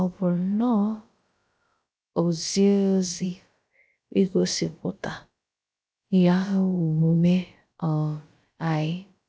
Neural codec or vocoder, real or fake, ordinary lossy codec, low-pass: codec, 16 kHz, about 1 kbps, DyCAST, with the encoder's durations; fake; none; none